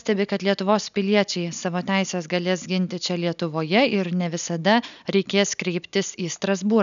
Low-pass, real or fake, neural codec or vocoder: 7.2 kHz; real; none